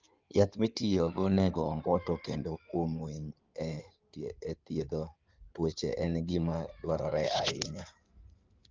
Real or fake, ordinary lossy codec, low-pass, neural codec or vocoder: fake; Opus, 24 kbps; 7.2 kHz; codec, 16 kHz in and 24 kHz out, 2.2 kbps, FireRedTTS-2 codec